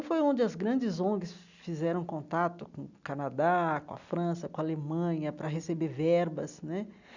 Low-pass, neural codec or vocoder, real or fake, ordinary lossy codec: 7.2 kHz; none; real; none